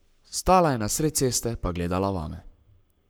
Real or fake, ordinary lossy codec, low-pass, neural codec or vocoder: fake; none; none; codec, 44.1 kHz, 7.8 kbps, Pupu-Codec